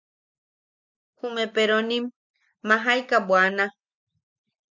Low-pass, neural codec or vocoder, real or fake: 7.2 kHz; none; real